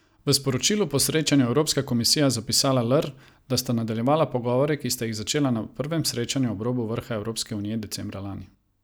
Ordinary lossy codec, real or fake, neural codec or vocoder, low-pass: none; real; none; none